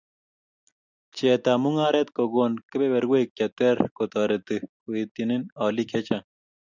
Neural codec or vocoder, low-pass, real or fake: none; 7.2 kHz; real